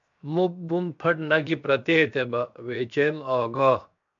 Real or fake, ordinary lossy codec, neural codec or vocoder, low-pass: fake; MP3, 64 kbps; codec, 16 kHz, 0.7 kbps, FocalCodec; 7.2 kHz